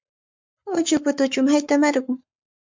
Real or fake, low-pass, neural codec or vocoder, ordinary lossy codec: fake; 7.2 kHz; codec, 44.1 kHz, 7.8 kbps, Pupu-Codec; MP3, 64 kbps